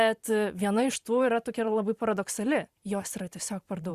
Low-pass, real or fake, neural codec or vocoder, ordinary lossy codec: 14.4 kHz; fake; vocoder, 44.1 kHz, 128 mel bands every 512 samples, BigVGAN v2; Opus, 64 kbps